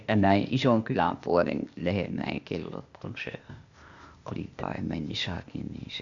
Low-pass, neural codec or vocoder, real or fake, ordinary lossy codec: 7.2 kHz; codec, 16 kHz, 0.8 kbps, ZipCodec; fake; Opus, 64 kbps